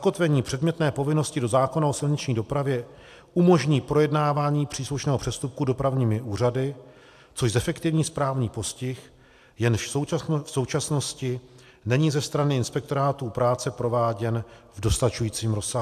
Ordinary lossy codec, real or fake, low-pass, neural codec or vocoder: AAC, 96 kbps; real; 14.4 kHz; none